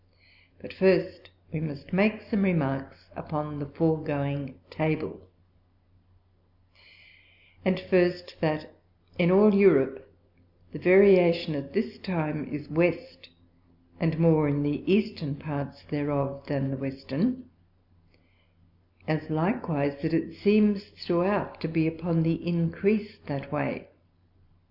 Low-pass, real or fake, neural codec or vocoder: 5.4 kHz; real; none